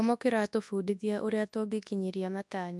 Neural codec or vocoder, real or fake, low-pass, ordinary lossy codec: codec, 24 kHz, 0.9 kbps, WavTokenizer, large speech release; fake; none; none